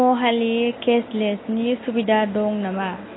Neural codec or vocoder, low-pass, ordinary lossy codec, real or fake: none; 7.2 kHz; AAC, 16 kbps; real